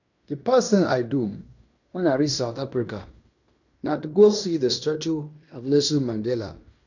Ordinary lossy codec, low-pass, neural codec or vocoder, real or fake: none; 7.2 kHz; codec, 16 kHz in and 24 kHz out, 0.9 kbps, LongCat-Audio-Codec, fine tuned four codebook decoder; fake